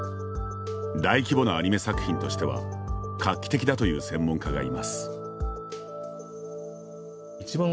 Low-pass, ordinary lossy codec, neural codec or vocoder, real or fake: none; none; none; real